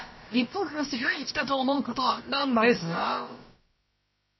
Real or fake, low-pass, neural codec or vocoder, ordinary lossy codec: fake; 7.2 kHz; codec, 16 kHz, about 1 kbps, DyCAST, with the encoder's durations; MP3, 24 kbps